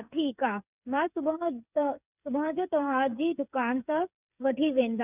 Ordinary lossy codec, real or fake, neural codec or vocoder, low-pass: none; fake; codec, 16 kHz, 8 kbps, FreqCodec, smaller model; 3.6 kHz